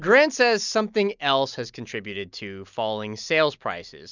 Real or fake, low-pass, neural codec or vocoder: real; 7.2 kHz; none